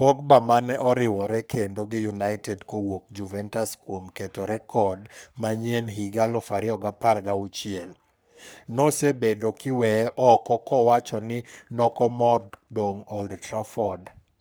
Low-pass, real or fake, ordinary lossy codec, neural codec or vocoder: none; fake; none; codec, 44.1 kHz, 3.4 kbps, Pupu-Codec